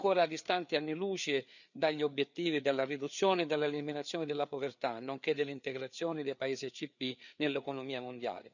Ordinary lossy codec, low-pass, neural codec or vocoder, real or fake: none; 7.2 kHz; codec, 16 kHz, 4 kbps, FreqCodec, larger model; fake